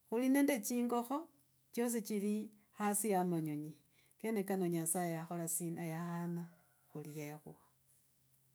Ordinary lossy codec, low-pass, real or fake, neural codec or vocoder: none; none; fake; autoencoder, 48 kHz, 128 numbers a frame, DAC-VAE, trained on Japanese speech